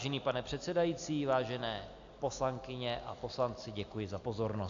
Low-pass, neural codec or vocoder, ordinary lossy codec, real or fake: 7.2 kHz; none; AAC, 64 kbps; real